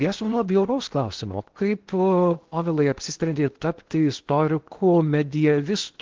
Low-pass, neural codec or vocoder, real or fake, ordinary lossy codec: 7.2 kHz; codec, 16 kHz in and 24 kHz out, 0.8 kbps, FocalCodec, streaming, 65536 codes; fake; Opus, 16 kbps